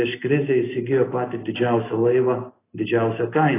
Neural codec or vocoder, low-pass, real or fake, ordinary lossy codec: none; 3.6 kHz; real; AAC, 16 kbps